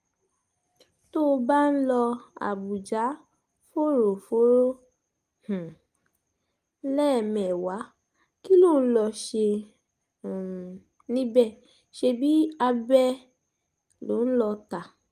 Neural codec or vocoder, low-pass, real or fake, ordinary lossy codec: none; 14.4 kHz; real; Opus, 32 kbps